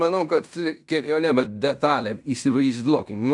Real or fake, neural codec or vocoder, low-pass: fake; codec, 16 kHz in and 24 kHz out, 0.9 kbps, LongCat-Audio-Codec, fine tuned four codebook decoder; 10.8 kHz